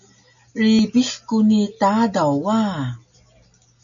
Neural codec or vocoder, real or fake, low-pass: none; real; 7.2 kHz